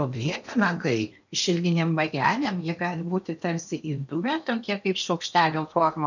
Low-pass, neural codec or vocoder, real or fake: 7.2 kHz; codec, 16 kHz in and 24 kHz out, 0.8 kbps, FocalCodec, streaming, 65536 codes; fake